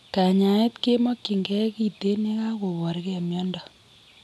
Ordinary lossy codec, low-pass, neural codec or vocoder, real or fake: none; none; none; real